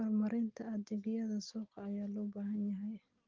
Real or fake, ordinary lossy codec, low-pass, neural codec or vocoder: real; Opus, 32 kbps; 7.2 kHz; none